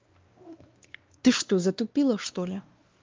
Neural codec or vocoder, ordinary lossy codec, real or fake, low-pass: codec, 16 kHz, 2 kbps, X-Codec, WavLM features, trained on Multilingual LibriSpeech; Opus, 24 kbps; fake; 7.2 kHz